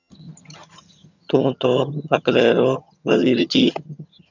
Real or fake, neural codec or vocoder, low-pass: fake; vocoder, 22.05 kHz, 80 mel bands, HiFi-GAN; 7.2 kHz